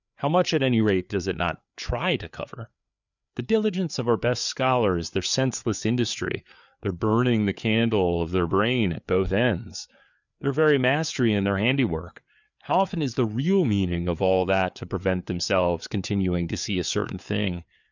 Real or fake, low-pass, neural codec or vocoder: fake; 7.2 kHz; codec, 16 kHz, 4 kbps, FreqCodec, larger model